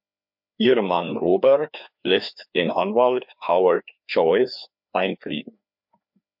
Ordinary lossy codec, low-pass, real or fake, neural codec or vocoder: MP3, 48 kbps; 5.4 kHz; fake; codec, 16 kHz, 2 kbps, FreqCodec, larger model